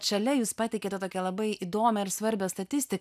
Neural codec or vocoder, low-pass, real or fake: none; 14.4 kHz; real